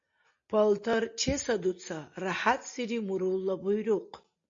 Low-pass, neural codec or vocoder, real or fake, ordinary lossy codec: 7.2 kHz; none; real; MP3, 48 kbps